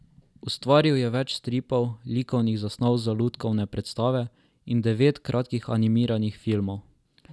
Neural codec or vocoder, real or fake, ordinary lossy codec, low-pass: none; real; none; none